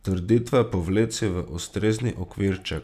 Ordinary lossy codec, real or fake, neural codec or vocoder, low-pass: none; real; none; 14.4 kHz